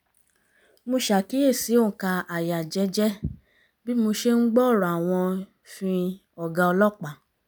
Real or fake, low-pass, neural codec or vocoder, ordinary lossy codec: real; none; none; none